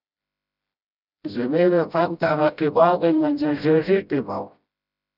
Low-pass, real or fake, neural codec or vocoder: 5.4 kHz; fake; codec, 16 kHz, 0.5 kbps, FreqCodec, smaller model